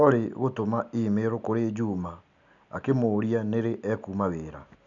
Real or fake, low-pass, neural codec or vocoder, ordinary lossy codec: real; 7.2 kHz; none; none